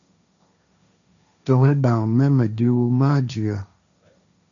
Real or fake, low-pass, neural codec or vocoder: fake; 7.2 kHz; codec, 16 kHz, 1.1 kbps, Voila-Tokenizer